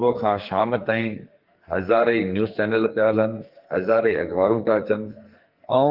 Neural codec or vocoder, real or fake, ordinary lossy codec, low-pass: codec, 44.1 kHz, 2.6 kbps, SNAC; fake; Opus, 32 kbps; 5.4 kHz